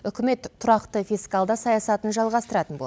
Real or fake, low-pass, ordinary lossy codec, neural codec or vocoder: real; none; none; none